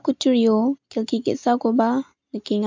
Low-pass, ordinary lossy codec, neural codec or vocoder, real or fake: 7.2 kHz; MP3, 64 kbps; none; real